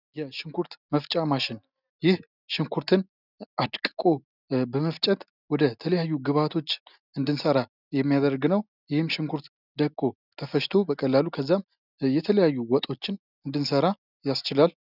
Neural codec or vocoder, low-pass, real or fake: none; 5.4 kHz; real